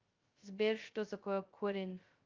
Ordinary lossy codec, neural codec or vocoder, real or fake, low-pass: Opus, 24 kbps; codec, 16 kHz, 0.2 kbps, FocalCodec; fake; 7.2 kHz